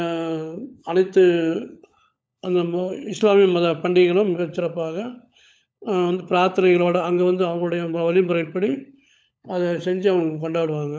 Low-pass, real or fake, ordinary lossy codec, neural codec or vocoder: none; fake; none; codec, 16 kHz, 16 kbps, FunCodec, trained on LibriTTS, 50 frames a second